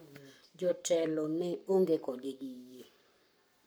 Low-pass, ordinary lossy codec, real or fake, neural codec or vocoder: none; none; fake; codec, 44.1 kHz, 7.8 kbps, Pupu-Codec